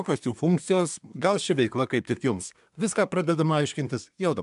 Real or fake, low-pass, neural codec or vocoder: fake; 10.8 kHz; codec, 24 kHz, 1 kbps, SNAC